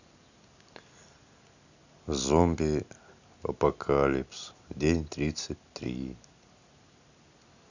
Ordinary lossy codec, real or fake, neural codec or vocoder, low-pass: none; real; none; 7.2 kHz